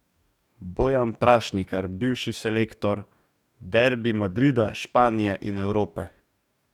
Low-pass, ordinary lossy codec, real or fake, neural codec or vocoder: 19.8 kHz; none; fake; codec, 44.1 kHz, 2.6 kbps, DAC